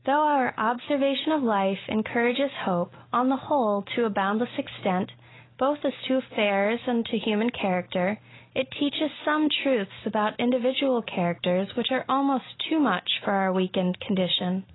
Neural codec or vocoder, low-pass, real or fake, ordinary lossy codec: none; 7.2 kHz; real; AAC, 16 kbps